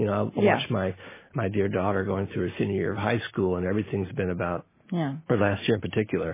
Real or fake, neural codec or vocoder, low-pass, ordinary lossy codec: real; none; 3.6 kHz; MP3, 16 kbps